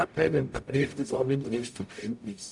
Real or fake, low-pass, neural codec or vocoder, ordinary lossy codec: fake; 10.8 kHz; codec, 44.1 kHz, 0.9 kbps, DAC; none